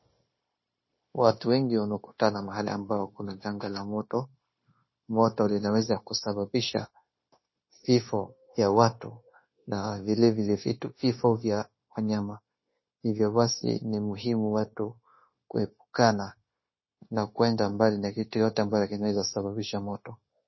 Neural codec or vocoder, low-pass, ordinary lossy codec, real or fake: codec, 16 kHz, 0.9 kbps, LongCat-Audio-Codec; 7.2 kHz; MP3, 24 kbps; fake